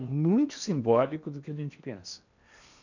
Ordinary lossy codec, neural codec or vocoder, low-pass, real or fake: none; codec, 16 kHz in and 24 kHz out, 0.8 kbps, FocalCodec, streaming, 65536 codes; 7.2 kHz; fake